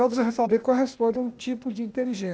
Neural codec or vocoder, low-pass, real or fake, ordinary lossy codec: codec, 16 kHz, 0.8 kbps, ZipCodec; none; fake; none